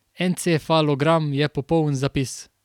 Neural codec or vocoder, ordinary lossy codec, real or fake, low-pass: none; none; real; 19.8 kHz